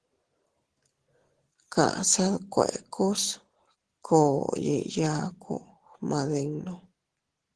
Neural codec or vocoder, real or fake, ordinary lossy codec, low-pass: none; real; Opus, 16 kbps; 10.8 kHz